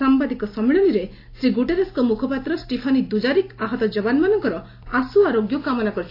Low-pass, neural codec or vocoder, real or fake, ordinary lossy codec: 5.4 kHz; none; real; AAC, 32 kbps